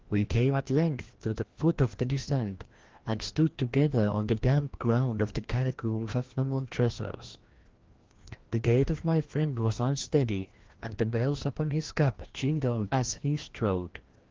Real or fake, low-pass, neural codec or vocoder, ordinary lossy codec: fake; 7.2 kHz; codec, 16 kHz, 1 kbps, FreqCodec, larger model; Opus, 16 kbps